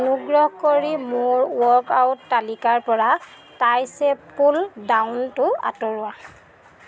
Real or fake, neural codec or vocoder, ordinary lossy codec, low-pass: real; none; none; none